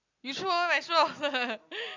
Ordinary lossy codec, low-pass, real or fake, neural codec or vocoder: MP3, 64 kbps; 7.2 kHz; real; none